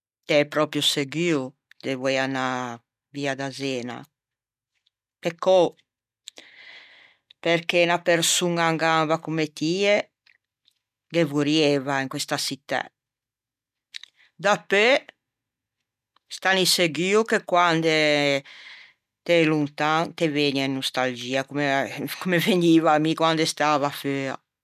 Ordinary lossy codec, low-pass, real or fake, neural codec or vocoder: none; 14.4 kHz; real; none